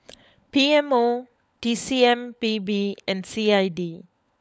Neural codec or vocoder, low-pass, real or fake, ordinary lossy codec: codec, 16 kHz, 16 kbps, FunCodec, trained on LibriTTS, 50 frames a second; none; fake; none